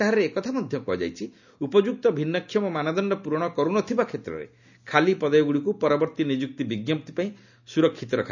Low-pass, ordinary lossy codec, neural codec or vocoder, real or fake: 7.2 kHz; none; none; real